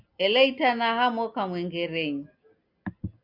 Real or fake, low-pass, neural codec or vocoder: real; 5.4 kHz; none